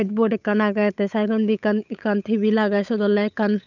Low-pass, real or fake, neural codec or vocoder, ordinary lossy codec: 7.2 kHz; fake; codec, 16 kHz, 8 kbps, FunCodec, trained on Chinese and English, 25 frames a second; none